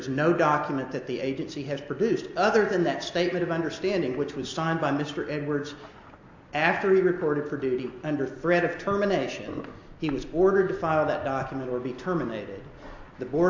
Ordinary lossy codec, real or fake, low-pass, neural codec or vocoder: MP3, 48 kbps; real; 7.2 kHz; none